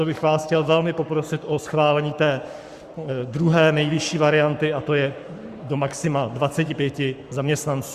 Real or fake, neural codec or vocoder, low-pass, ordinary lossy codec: fake; codec, 44.1 kHz, 7.8 kbps, Pupu-Codec; 14.4 kHz; Opus, 64 kbps